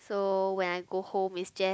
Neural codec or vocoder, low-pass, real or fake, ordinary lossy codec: none; none; real; none